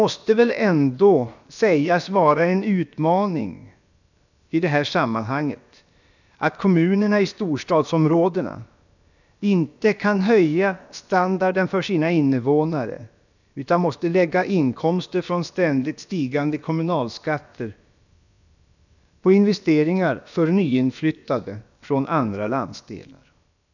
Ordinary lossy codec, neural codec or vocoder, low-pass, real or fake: none; codec, 16 kHz, about 1 kbps, DyCAST, with the encoder's durations; 7.2 kHz; fake